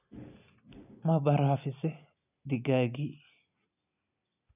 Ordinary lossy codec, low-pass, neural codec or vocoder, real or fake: none; 3.6 kHz; none; real